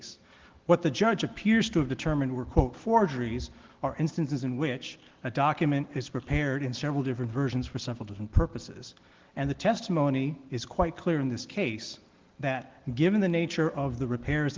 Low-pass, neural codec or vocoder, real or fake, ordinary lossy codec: 7.2 kHz; none; real; Opus, 16 kbps